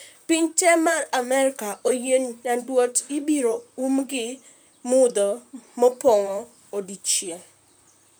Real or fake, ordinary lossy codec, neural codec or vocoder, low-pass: fake; none; vocoder, 44.1 kHz, 128 mel bands, Pupu-Vocoder; none